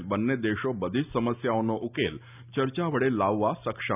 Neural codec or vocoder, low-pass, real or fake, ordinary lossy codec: none; 3.6 kHz; real; none